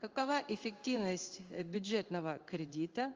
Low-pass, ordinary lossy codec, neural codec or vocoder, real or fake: 7.2 kHz; Opus, 32 kbps; codec, 16 kHz in and 24 kHz out, 1 kbps, XY-Tokenizer; fake